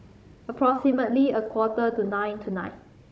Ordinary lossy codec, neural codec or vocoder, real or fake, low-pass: none; codec, 16 kHz, 16 kbps, FunCodec, trained on Chinese and English, 50 frames a second; fake; none